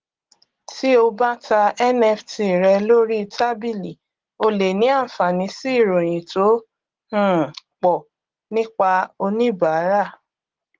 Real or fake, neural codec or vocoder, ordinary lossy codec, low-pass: real; none; Opus, 16 kbps; 7.2 kHz